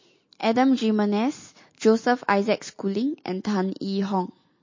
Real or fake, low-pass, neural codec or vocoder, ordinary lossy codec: real; 7.2 kHz; none; MP3, 32 kbps